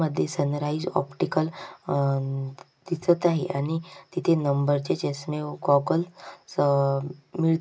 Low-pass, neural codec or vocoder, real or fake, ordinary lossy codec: none; none; real; none